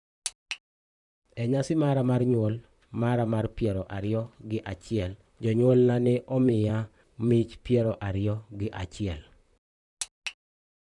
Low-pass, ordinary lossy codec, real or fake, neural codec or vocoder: 10.8 kHz; none; fake; vocoder, 44.1 kHz, 128 mel bands, Pupu-Vocoder